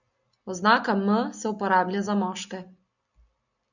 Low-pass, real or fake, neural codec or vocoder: 7.2 kHz; real; none